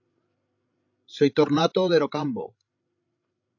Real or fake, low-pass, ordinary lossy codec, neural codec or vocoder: fake; 7.2 kHz; AAC, 48 kbps; codec, 16 kHz, 16 kbps, FreqCodec, larger model